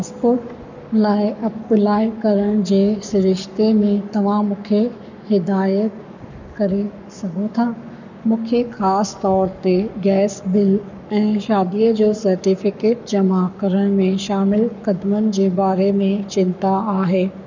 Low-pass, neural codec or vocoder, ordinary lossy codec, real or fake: 7.2 kHz; codec, 44.1 kHz, 7.8 kbps, Pupu-Codec; none; fake